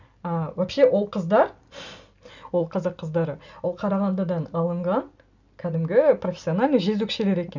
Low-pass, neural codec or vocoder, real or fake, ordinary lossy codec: 7.2 kHz; none; real; none